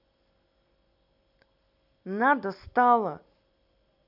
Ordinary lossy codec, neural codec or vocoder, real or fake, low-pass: none; none; real; 5.4 kHz